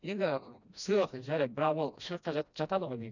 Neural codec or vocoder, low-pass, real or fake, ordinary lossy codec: codec, 16 kHz, 1 kbps, FreqCodec, smaller model; 7.2 kHz; fake; none